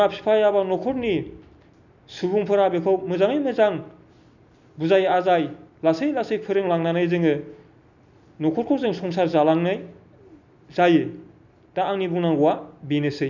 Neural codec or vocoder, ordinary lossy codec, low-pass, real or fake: none; none; 7.2 kHz; real